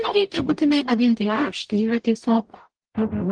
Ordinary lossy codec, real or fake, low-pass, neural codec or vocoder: Opus, 24 kbps; fake; 9.9 kHz; codec, 44.1 kHz, 0.9 kbps, DAC